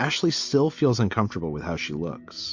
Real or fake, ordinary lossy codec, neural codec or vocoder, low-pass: real; MP3, 48 kbps; none; 7.2 kHz